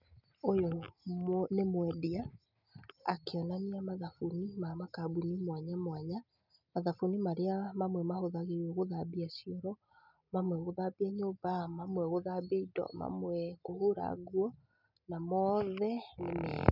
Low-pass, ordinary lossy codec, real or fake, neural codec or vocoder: 5.4 kHz; none; real; none